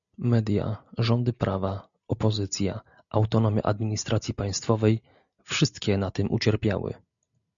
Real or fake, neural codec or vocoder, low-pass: real; none; 7.2 kHz